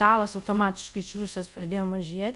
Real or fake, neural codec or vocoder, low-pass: fake; codec, 24 kHz, 0.5 kbps, DualCodec; 10.8 kHz